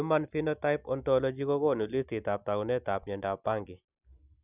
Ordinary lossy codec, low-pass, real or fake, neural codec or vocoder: none; 3.6 kHz; real; none